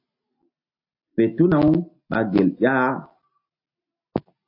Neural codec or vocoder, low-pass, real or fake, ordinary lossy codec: none; 5.4 kHz; real; MP3, 32 kbps